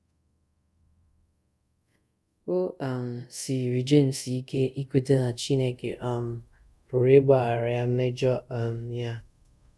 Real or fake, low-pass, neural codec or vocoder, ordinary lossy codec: fake; none; codec, 24 kHz, 0.5 kbps, DualCodec; none